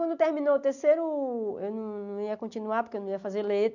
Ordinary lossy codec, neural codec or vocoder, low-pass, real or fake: none; none; 7.2 kHz; real